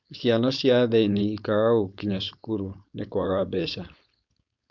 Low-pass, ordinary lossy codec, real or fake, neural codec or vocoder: 7.2 kHz; none; fake; codec, 16 kHz, 4.8 kbps, FACodec